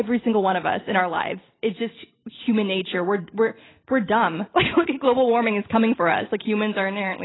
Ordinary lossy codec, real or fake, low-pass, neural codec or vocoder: AAC, 16 kbps; real; 7.2 kHz; none